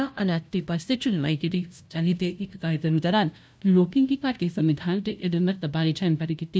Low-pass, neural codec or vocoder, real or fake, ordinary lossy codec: none; codec, 16 kHz, 0.5 kbps, FunCodec, trained on LibriTTS, 25 frames a second; fake; none